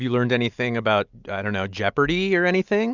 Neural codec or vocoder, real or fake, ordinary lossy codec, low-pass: none; real; Opus, 64 kbps; 7.2 kHz